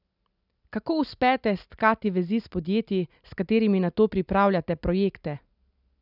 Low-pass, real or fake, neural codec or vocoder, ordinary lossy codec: 5.4 kHz; real; none; none